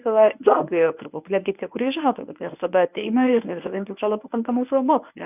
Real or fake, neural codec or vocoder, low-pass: fake; codec, 24 kHz, 0.9 kbps, WavTokenizer, medium speech release version 1; 3.6 kHz